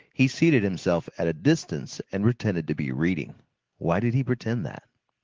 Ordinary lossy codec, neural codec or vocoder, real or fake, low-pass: Opus, 16 kbps; none; real; 7.2 kHz